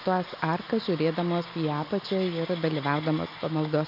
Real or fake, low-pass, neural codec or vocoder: real; 5.4 kHz; none